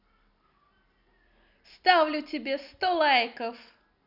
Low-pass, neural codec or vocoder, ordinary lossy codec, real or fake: 5.4 kHz; none; none; real